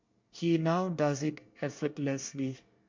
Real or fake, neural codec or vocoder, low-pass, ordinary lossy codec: fake; codec, 24 kHz, 1 kbps, SNAC; 7.2 kHz; MP3, 48 kbps